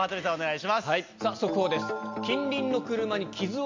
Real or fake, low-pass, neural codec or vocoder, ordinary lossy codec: real; 7.2 kHz; none; MP3, 64 kbps